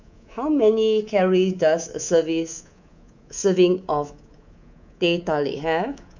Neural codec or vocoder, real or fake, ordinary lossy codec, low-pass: codec, 24 kHz, 3.1 kbps, DualCodec; fake; none; 7.2 kHz